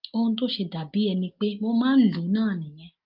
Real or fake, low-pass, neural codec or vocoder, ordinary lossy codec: real; 5.4 kHz; none; Opus, 32 kbps